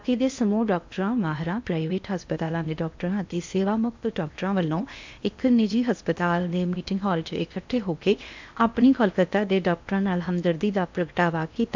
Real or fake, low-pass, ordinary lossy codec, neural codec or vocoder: fake; 7.2 kHz; MP3, 64 kbps; codec, 16 kHz in and 24 kHz out, 0.8 kbps, FocalCodec, streaming, 65536 codes